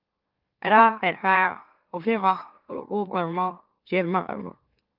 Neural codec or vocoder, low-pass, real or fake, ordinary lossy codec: autoencoder, 44.1 kHz, a latent of 192 numbers a frame, MeloTTS; 5.4 kHz; fake; Opus, 24 kbps